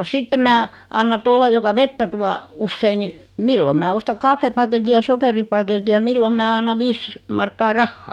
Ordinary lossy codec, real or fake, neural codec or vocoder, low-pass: none; fake; codec, 44.1 kHz, 2.6 kbps, DAC; 19.8 kHz